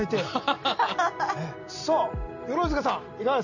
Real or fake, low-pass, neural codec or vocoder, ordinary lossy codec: real; 7.2 kHz; none; none